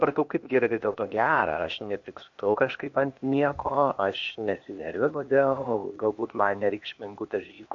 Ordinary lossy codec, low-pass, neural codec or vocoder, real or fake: MP3, 48 kbps; 7.2 kHz; codec, 16 kHz, 0.8 kbps, ZipCodec; fake